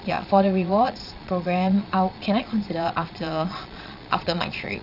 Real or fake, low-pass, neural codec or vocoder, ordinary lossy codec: fake; 5.4 kHz; vocoder, 22.05 kHz, 80 mel bands, Vocos; none